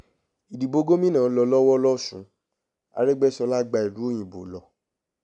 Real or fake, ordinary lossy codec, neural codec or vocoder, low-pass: real; none; none; 10.8 kHz